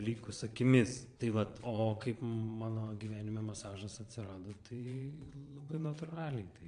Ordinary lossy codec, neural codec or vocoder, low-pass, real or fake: MP3, 64 kbps; vocoder, 22.05 kHz, 80 mel bands, Vocos; 9.9 kHz; fake